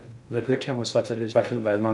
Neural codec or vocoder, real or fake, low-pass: codec, 16 kHz in and 24 kHz out, 0.6 kbps, FocalCodec, streaming, 2048 codes; fake; 10.8 kHz